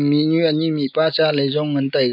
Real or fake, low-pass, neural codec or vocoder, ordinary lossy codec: real; 5.4 kHz; none; AAC, 48 kbps